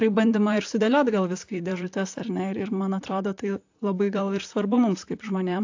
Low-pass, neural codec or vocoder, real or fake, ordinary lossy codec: 7.2 kHz; vocoder, 44.1 kHz, 128 mel bands, Pupu-Vocoder; fake; AAC, 48 kbps